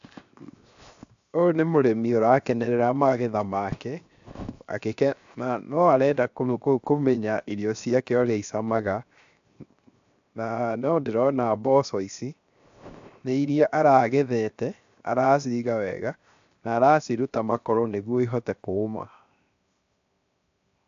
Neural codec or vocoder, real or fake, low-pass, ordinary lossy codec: codec, 16 kHz, 0.7 kbps, FocalCodec; fake; 7.2 kHz; AAC, 64 kbps